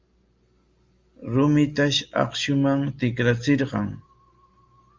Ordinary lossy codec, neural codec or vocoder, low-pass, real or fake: Opus, 32 kbps; none; 7.2 kHz; real